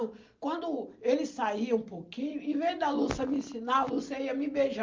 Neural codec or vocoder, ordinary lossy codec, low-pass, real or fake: none; Opus, 24 kbps; 7.2 kHz; real